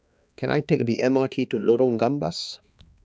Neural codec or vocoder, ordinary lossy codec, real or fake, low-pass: codec, 16 kHz, 2 kbps, X-Codec, HuBERT features, trained on balanced general audio; none; fake; none